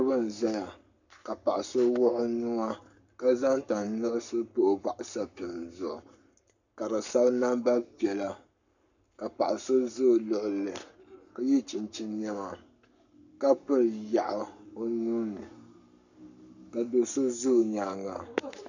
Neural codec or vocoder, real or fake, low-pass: codec, 44.1 kHz, 7.8 kbps, Pupu-Codec; fake; 7.2 kHz